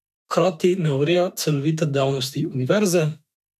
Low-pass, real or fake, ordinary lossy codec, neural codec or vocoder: 14.4 kHz; fake; none; autoencoder, 48 kHz, 32 numbers a frame, DAC-VAE, trained on Japanese speech